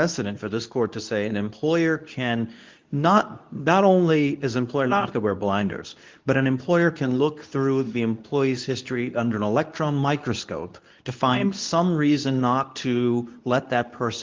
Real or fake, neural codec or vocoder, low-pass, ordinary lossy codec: fake; codec, 24 kHz, 0.9 kbps, WavTokenizer, medium speech release version 2; 7.2 kHz; Opus, 24 kbps